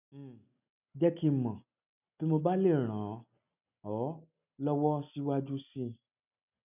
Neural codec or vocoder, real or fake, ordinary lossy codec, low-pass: none; real; none; 3.6 kHz